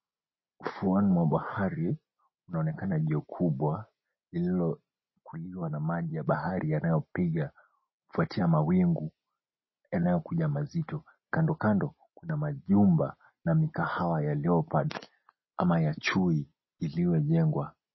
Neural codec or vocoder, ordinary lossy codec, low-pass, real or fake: none; MP3, 24 kbps; 7.2 kHz; real